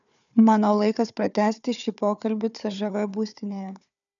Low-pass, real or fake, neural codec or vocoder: 7.2 kHz; fake; codec, 16 kHz, 4 kbps, FunCodec, trained on Chinese and English, 50 frames a second